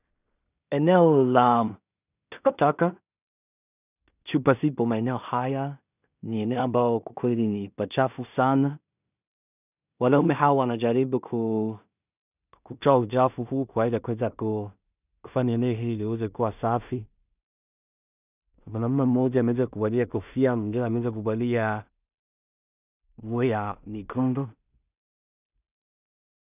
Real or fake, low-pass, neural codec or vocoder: fake; 3.6 kHz; codec, 16 kHz in and 24 kHz out, 0.4 kbps, LongCat-Audio-Codec, two codebook decoder